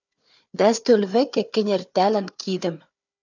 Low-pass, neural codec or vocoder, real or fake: 7.2 kHz; codec, 16 kHz, 4 kbps, FunCodec, trained on Chinese and English, 50 frames a second; fake